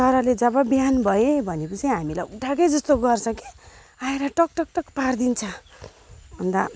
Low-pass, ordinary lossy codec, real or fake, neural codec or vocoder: none; none; real; none